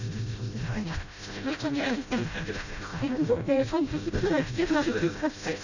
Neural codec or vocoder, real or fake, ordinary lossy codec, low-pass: codec, 16 kHz, 0.5 kbps, FreqCodec, smaller model; fake; none; 7.2 kHz